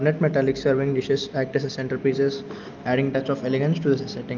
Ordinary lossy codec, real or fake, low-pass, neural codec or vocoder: Opus, 24 kbps; real; 7.2 kHz; none